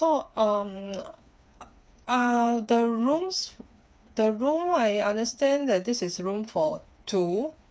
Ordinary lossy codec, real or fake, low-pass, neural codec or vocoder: none; fake; none; codec, 16 kHz, 4 kbps, FreqCodec, smaller model